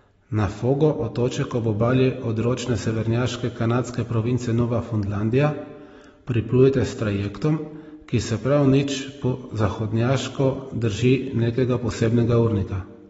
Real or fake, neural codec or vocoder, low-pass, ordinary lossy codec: real; none; 14.4 kHz; AAC, 24 kbps